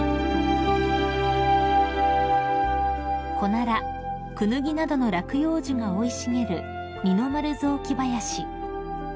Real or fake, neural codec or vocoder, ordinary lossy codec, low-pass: real; none; none; none